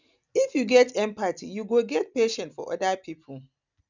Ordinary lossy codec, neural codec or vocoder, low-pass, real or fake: none; none; 7.2 kHz; real